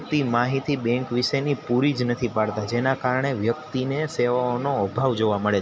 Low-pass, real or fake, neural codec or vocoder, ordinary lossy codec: none; real; none; none